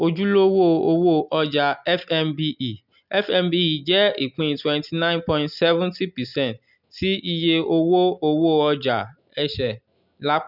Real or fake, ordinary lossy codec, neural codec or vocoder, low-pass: real; none; none; 5.4 kHz